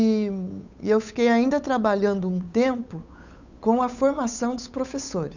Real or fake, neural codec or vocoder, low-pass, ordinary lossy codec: fake; codec, 16 kHz, 8 kbps, FunCodec, trained on Chinese and English, 25 frames a second; 7.2 kHz; none